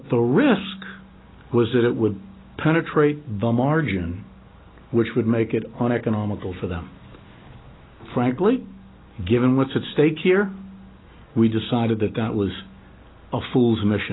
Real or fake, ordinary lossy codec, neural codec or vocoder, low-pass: real; AAC, 16 kbps; none; 7.2 kHz